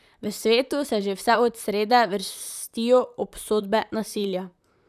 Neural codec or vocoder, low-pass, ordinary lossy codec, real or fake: vocoder, 44.1 kHz, 128 mel bands, Pupu-Vocoder; 14.4 kHz; none; fake